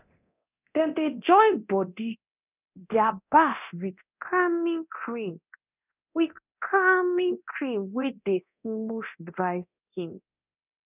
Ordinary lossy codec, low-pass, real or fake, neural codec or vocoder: none; 3.6 kHz; fake; codec, 24 kHz, 0.9 kbps, DualCodec